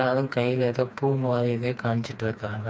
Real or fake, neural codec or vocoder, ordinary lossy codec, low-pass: fake; codec, 16 kHz, 2 kbps, FreqCodec, smaller model; none; none